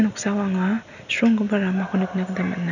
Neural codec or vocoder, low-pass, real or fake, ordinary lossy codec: none; 7.2 kHz; real; none